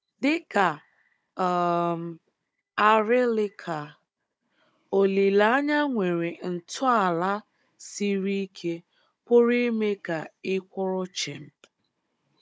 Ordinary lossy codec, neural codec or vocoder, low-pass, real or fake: none; codec, 16 kHz, 16 kbps, FunCodec, trained on Chinese and English, 50 frames a second; none; fake